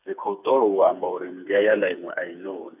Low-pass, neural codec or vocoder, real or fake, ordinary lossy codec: 3.6 kHz; codec, 16 kHz, 4 kbps, FreqCodec, smaller model; fake; none